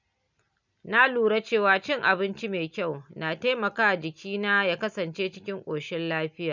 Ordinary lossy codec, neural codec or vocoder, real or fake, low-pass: none; none; real; 7.2 kHz